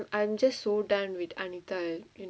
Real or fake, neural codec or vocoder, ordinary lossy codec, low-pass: real; none; none; none